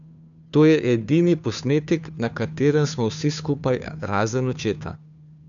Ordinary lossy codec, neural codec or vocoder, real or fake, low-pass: none; codec, 16 kHz, 2 kbps, FunCodec, trained on Chinese and English, 25 frames a second; fake; 7.2 kHz